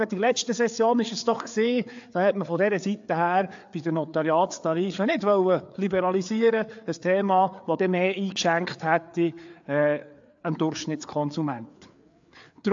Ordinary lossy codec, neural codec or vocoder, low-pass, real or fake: AAC, 64 kbps; codec, 16 kHz, 4 kbps, FreqCodec, larger model; 7.2 kHz; fake